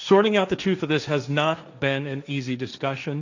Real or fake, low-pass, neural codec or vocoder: fake; 7.2 kHz; codec, 16 kHz, 1.1 kbps, Voila-Tokenizer